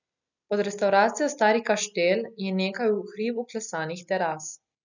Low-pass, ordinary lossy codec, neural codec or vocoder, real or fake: 7.2 kHz; none; none; real